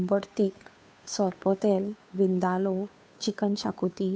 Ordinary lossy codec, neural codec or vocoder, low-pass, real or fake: none; codec, 16 kHz, 2 kbps, FunCodec, trained on Chinese and English, 25 frames a second; none; fake